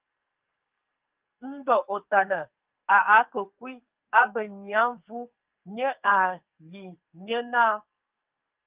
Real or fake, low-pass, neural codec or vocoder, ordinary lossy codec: fake; 3.6 kHz; codec, 44.1 kHz, 2.6 kbps, SNAC; Opus, 24 kbps